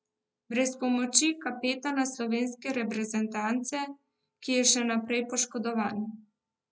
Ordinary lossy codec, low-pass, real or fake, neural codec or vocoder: none; none; real; none